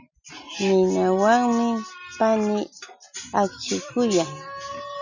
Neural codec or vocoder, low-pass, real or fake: none; 7.2 kHz; real